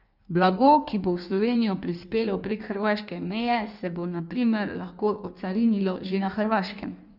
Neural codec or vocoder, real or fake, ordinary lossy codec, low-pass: codec, 16 kHz in and 24 kHz out, 1.1 kbps, FireRedTTS-2 codec; fake; none; 5.4 kHz